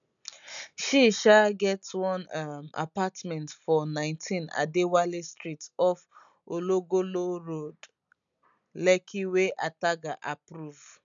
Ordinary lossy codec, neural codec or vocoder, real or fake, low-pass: none; none; real; 7.2 kHz